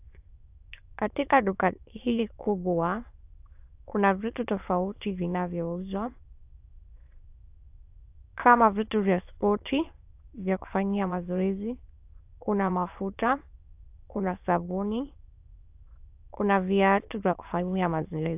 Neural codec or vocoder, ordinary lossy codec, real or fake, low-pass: autoencoder, 22.05 kHz, a latent of 192 numbers a frame, VITS, trained on many speakers; AAC, 32 kbps; fake; 3.6 kHz